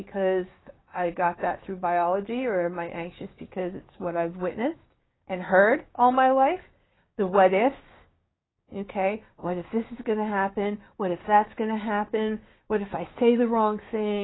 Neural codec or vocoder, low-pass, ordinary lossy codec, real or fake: codec, 16 kHz, 6 kbps, DAC; 7.2 kHz; AAC, 16 kbps; fake